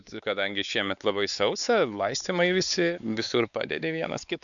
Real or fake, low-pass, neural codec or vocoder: fake; 7.2 kHz; codec, 16 kHz, 4 kbps, X-Codec, WavLM features, trained on Multilingual LibriSpeech